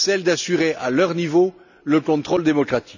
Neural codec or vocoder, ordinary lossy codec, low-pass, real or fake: none; none; 7.2 kHz; real